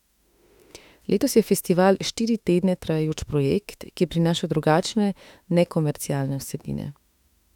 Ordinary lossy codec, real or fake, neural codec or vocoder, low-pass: none; fake; autoencoder, 48 kHz, 32 numbers a frame, DAC-VAE, trained on Japanese speech; 19.8 kHz